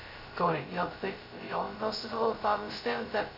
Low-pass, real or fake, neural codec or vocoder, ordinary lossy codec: 5.4 kHz; fake; codec, 16 kHz, 0.2 kbps, FocalCodec; none